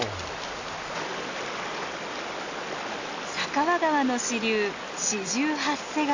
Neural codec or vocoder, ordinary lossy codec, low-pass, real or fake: none; AAC, 48 kbps; 7.2 kHz; real